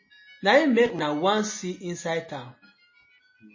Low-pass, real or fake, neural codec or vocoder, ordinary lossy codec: 7.2 kHz; real; none; MP3, 32 kbps